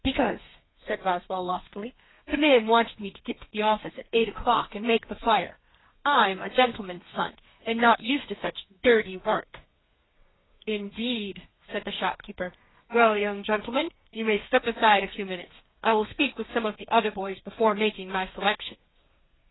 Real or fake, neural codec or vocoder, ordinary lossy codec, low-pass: fake; codec, 32 kHz, 1.9 kbps, SNAC; AAC, 16 kbps; 7.2 kHz